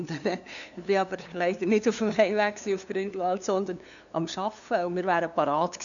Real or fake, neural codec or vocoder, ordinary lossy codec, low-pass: fake; codec, 16 kHz, 2 kbps, FunCodec, trained on LibriTTS, 25 frames a second; none; 7.2 kHz